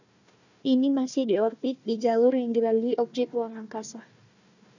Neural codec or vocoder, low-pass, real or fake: codec, 16 kHz, 1 kbps, FunCodec, trained on Chinese and English, 50 frames a second; 7.2 kHz; fake